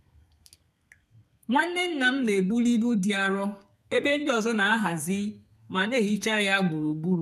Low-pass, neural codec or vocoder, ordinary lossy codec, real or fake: 14.4 kHz; codec, 32 kHz, 1.9 kbps, SNAC; none; fake